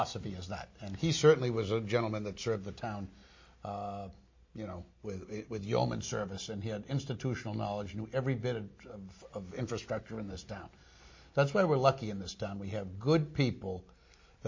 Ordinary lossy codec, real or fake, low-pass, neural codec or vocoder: MP3, 32 kbps; real; 7.2 kHz; none